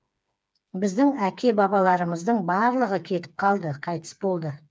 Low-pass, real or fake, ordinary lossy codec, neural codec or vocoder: none; fake; none; codec, 16 kHz, 4 kbps, FreqCodec, smaller model